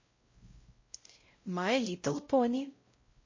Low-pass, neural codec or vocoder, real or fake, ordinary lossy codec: 7.2 kHz; codec, 16 kHz, 0.5 kbps, X-Codec, WavLM features, trained on Multilingual LibriSpeech; fake; MP3, 32 kbps